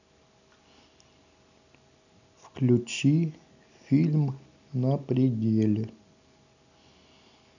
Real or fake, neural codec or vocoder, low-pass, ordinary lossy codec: real; none; 7.2 kHz; none